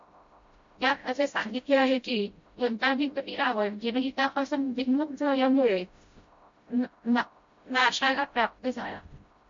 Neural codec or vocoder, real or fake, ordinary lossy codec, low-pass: codec, 16 kHz, 0.5 kbps, FreqCodec, smaller model; fake; MP3, 48 kbps; 7.2 kHz